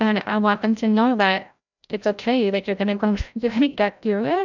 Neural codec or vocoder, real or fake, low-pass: codec, 16 kHz, 0.5 kbps, FreqCodec, larger model; fake; 7.2 kHz